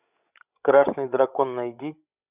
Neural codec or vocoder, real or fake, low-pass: codec, 16 kHz, 16 kbps, FreqCodec, larger model; fake; 3.6 kHz